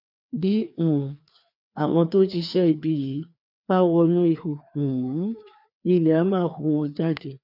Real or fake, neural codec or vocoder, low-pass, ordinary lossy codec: fake; codec, 16 kHz, 2 kbps, FreqCodec, larger model; 5.4 kHz; none